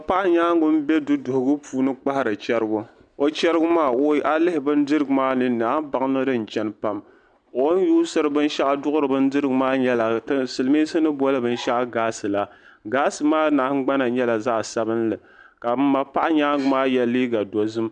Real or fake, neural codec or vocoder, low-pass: real; none; 9.9 kHz